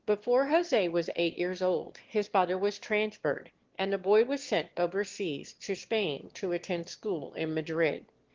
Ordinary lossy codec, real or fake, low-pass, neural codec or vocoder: Opus, 16 kbps; fake; 7.2 kHz; autoencoder, 22.05 kHz, a latent of 192 numbers a frame, VITS, trained on one speaker